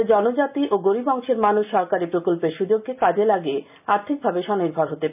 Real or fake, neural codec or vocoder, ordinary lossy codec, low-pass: real; none; none; 3.6 kHz